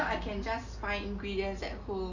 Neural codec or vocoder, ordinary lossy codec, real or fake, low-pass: none; none; real; 7.2 kHz